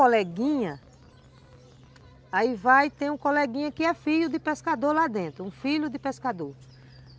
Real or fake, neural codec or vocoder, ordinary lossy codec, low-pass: real; none; none; none